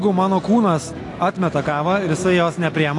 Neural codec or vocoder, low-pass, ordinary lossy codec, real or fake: none; 10.8 kHz; AAC, 48 kbps; real